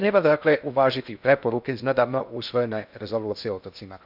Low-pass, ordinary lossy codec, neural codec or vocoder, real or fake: 5.4 kHz; none; codec, 16 kHz in and 24 kHz out, 0.6 kbps, FocalCodec, streaming, 2048 codes; fake